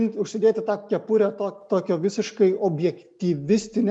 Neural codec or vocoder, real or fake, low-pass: none; real; 9.9 kHz